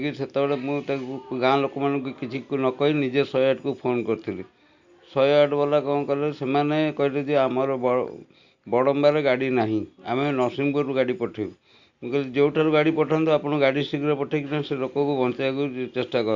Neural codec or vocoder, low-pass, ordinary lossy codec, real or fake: none; 7.2 kHz; Opus, 64 kbps; real